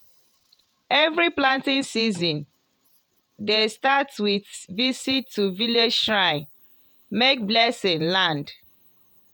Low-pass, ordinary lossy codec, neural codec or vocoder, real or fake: none; none; vocoder, 48 kHz, 128 mel bands, Vocos; fake